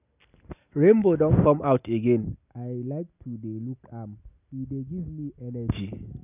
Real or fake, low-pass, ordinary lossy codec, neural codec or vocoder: real; 3.6 kHz; none; none